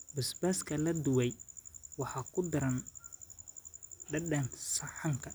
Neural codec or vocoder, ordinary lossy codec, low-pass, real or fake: none; none; none; real